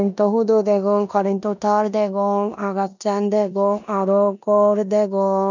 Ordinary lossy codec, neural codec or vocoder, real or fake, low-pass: none; codec, 16 kHz in and 24 kHz out, 0.9 kbps, LongCat-Audio-Codec, four codebook decoder; fake; 7.2 kHz